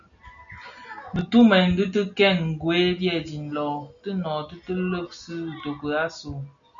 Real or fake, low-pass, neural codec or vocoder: real; 7.2 kHz; none